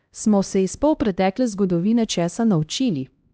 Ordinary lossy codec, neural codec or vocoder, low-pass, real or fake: none; codec, 16 kHz, 1 kbps, X-Codec, HuBERT features, trained on LibriSpeech; none; fake